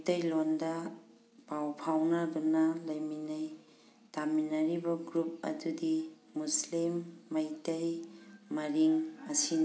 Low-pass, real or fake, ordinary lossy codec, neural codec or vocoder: none; real; none; none